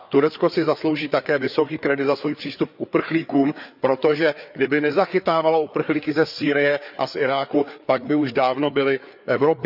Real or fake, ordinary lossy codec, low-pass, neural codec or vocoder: fake; none; 5.4 kHz; codec, 16 kHz, 4 kbps, FreqCodec, larger model